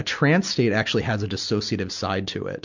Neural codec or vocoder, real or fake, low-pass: none; real; 7.2 kHz